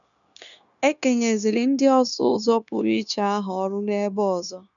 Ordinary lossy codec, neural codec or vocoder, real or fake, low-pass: none; codec, 16 kHz, 0.9 kbps, LongCat-Audio-Codec; fake; 7.2 kHz